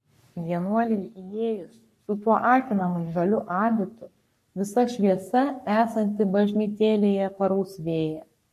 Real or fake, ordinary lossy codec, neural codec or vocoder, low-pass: fake; MP3, 64 kbps; codec, 44.1 kHz, 3.4 kbps, Pupu-Codec; 14.4 kHz